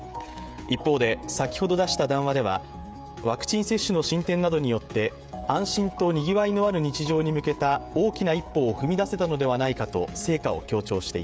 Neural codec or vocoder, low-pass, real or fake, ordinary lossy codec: codec, 16 kHz, 16 kbps, FreqCodec, smaller model; none; fake; none